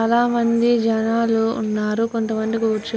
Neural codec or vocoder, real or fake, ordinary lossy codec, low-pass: none; real; none; none